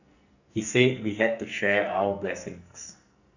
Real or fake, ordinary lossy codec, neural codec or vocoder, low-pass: fake; none; codec, 44.1 kHz, 2.6 kbps, SNAC; 7.2 kHz